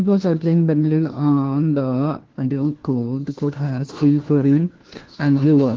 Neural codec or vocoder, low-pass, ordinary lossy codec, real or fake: codec, 16 kHz, 1 kbps, FunCodec, trained on LibriTTS, 50 frames a second; 7.2 kHz; Opus, 16 kbps; fake